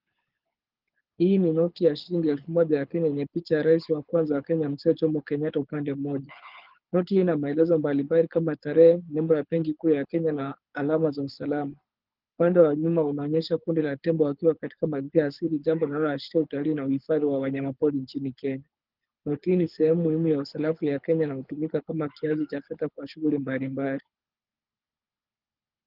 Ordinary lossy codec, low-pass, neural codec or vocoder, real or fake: Opus, 24 kbps; 5.4 kHz; codec, 24 kHz, 6 kbps, HILCodec; fake